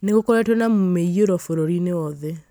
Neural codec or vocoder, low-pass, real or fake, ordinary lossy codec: none; none; real; none